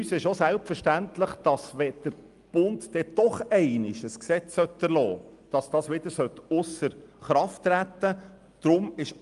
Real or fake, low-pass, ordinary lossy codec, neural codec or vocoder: real; 10.8 kHz; Opus, 32 kbps; none